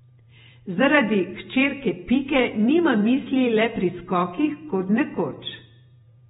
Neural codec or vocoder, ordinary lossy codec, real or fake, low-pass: vocoder, 48 kHz, 128 mel bands, Vocos; AAC, 16 kbps; fake; 19.8 kHz